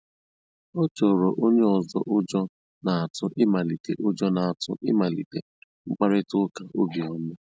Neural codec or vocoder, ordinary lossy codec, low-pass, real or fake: none; none; none; real